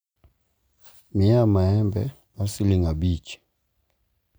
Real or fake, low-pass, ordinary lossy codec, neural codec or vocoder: real; none; none; none